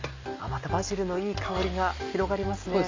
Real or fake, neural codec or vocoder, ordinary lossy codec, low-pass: real; none; MP3, 64 kbps; 7.2 kHz